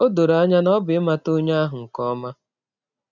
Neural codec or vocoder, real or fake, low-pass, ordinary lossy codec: none; real; 7.2 kHz; none